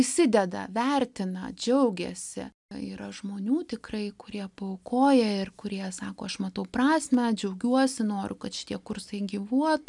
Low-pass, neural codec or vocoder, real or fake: 10.8 kHz; none; real